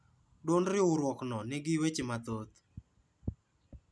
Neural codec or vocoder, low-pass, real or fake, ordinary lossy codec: none; none; real; none